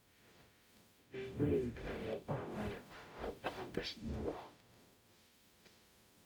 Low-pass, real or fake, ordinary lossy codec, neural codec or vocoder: none; fake; none; codec, 44.1 kHz, 0.9 kbps, DAC